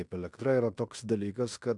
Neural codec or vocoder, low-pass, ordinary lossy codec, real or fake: codec, 16 kHz in and 24 kHz out, 0.9 kbps, LongCat-Audio-Codec, fine tuned four codebook decoder; 10.8 kHz; MP3, 96 kbps; fake